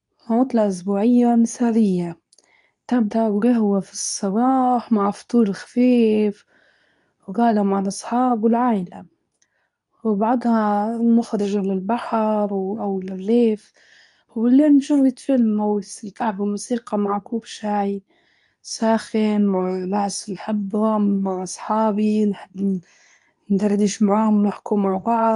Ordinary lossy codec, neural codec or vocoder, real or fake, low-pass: none; codec, 24 kHz, 0.9 kbps, WavTokenizer, medium speech release version 2; fake; 10.8 kHz